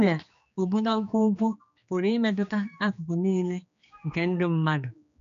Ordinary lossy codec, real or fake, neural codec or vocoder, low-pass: none; fake; codec, 16 kHz, 2 kbps, X-Codec, HuBERT features, trained on general audio; 7.2 kHz